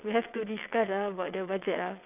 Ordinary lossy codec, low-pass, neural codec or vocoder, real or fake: none; 3.6 kHz; vocoder, 22.05 kHz, 80 mel bands, WaveNeXt; fake